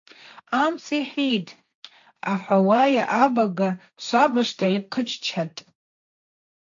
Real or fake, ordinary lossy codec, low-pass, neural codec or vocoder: fake; AAC, 64 kbps; 7.2 kHz; codec, 16 kHz, 1.1 kbps, Voila-Tokenizer